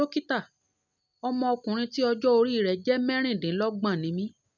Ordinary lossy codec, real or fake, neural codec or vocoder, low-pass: none; real; none; 7.2 kHz